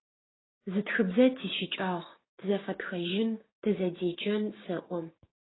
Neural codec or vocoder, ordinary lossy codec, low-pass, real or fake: codec, 16 kHz, 6 kbps, DAC; AAC, 16 kbps; 7.2 kHz; fake